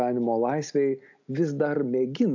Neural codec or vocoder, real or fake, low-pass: none; real; 7.2 kHz